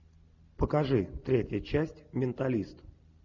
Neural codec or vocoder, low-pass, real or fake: none; 7.2 kHz; real